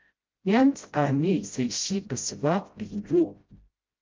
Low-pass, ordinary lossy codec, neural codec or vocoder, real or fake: 7.2 kHz; Opus, 24 kbps; codec, 16 kHz, 0.5 kbps, FreqCodec, smaller model; fake